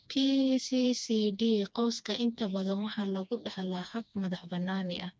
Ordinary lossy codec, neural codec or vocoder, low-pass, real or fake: none; codec, 16 kHz, 2 kbps, FreqCodec, smaller model; none; fake